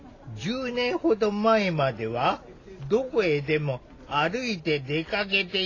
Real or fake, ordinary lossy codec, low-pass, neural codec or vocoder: real; AAC, 32 kbps; 7.2 kHz; none